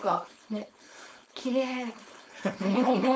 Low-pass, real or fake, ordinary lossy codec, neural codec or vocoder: none; fake; none; codec, 16 kHz, 4.8 kbps, FACodec